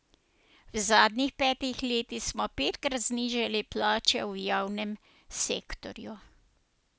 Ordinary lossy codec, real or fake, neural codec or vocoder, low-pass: none; real; none; none